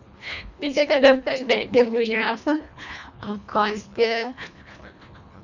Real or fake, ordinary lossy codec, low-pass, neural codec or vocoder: fake; none; 7.2 kHz; codec, 24 kHz, 1.5 kbps, HILCodec